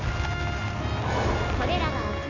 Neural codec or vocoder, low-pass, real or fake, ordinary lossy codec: none; 7.2 kHz; real; none